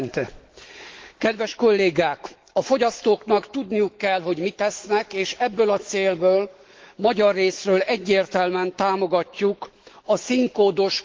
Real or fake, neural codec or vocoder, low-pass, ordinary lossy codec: fake; autoencoder, 48 kHz, 128 numbers a frame, DAC-VAE, trained on Japanese speech; 7.2 kHz; Opus, 16 kbps